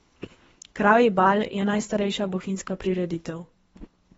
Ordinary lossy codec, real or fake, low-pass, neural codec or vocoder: AAC, 24 kbps; fake; 10.8 kHz; codec, 24 kHz, 3 kbps, HILCodec